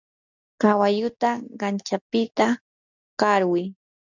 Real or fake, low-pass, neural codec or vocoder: real; 7.2 kHz; none